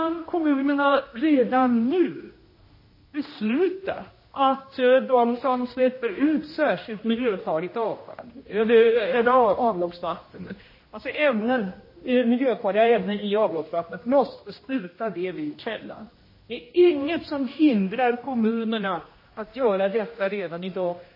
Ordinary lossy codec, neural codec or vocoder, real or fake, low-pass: MP3, 24 kbps; codec, 16 kHz, 1 kbps, X-Codec, HuBERT features, trained on general audio; fake; 5.4 kHz